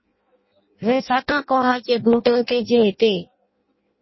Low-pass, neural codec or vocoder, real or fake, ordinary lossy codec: 7.2 kHz; codec, 16 kHz in and 24 kHz out, 0.6 kbps, FireRedTTS-2 codec; fake; MP3, 24 kbps